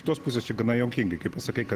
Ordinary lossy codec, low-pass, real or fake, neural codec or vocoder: Opus, 16 kbps; 14.4 kHz; real; none